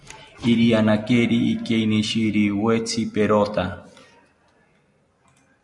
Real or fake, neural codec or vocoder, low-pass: real; none; 10.8 kHz